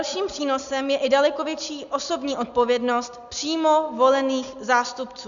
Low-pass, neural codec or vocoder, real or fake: 7.2 kHz; none; real